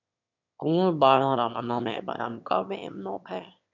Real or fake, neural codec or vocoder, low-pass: fake; autoencoder, 22.05 kHz, a latent of 192 numbers a frame, VITS, trained on one speaker; 7.2 kHz